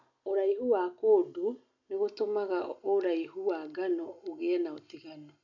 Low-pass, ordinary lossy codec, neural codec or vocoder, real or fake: 7.2 kHz; none; none; real